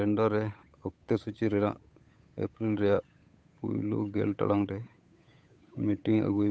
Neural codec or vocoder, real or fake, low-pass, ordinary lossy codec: codec, 16 kHz, 8 kbps, FunCodec, trained on Chinese and English, 25 frames a second; fake; none; none